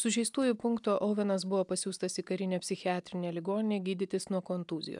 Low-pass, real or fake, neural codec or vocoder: 10.8 kHz; real; none